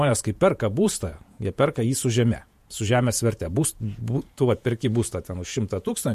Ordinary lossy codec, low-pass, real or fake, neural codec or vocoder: MP3, 64 kbps; 14.4 kHz; fake; vocoder, 48 kHz, 128 mel bands, Vocos